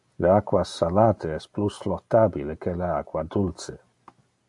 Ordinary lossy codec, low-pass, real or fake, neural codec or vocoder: AAC, 64 kbps; 10.8 kHz; real; none